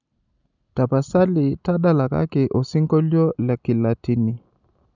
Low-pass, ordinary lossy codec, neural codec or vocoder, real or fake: 7.2 kHz; none; none; real